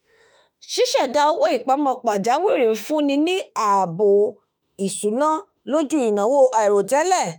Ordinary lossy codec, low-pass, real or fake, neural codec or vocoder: none; none; fake; autoencoder, 48 kHz, 32 numbers a frame, DAC-VAE, trained on Japanese speech